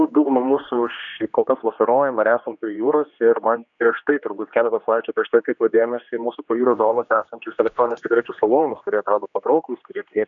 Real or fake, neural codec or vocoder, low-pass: fake; codec, 16 kHz, 2 kbps, X-Codec, HuBERT features, trained on general audio; 7.2 kHz